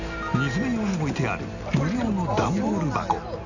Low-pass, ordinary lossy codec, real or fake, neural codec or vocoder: 7.2 kHz; none; real; none